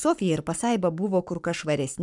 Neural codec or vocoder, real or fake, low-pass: codec, 44.1 kHz, 7.8 kbps, Pupu-Codec; fake; 10.8 kHz